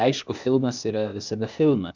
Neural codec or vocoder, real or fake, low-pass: codec, 16 kHz, 0.8 kbps, ZipCodec; fake; 7.2 kHz